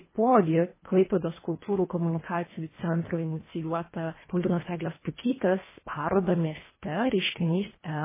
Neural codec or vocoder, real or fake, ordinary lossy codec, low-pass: codec, 24 kHz, 1.5 kbps, HILCodec; fake; MP3, 16 kbps; 3.6 kHz